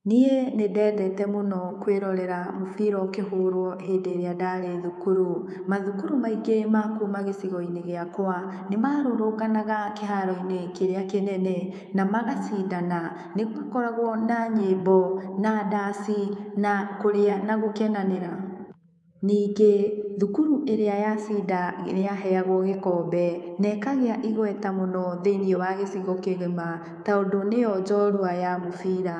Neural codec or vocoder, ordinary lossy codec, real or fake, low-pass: codec, 24 kHz, 3.1 kbps, DualCodec; none; fake; none